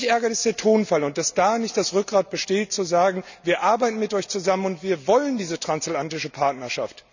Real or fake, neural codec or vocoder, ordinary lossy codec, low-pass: real; none; none; 7.2 kHz